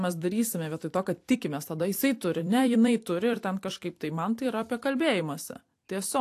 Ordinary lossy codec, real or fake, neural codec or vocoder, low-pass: AAC, 64 kbps; fake; vocoder, 44.1 kHz, 128 mel bands every 256 samples, BigVGAN v2; 14.4 kHz